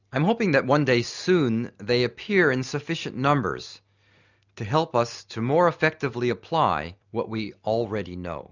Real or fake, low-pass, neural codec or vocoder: real; 7.2 kHz; none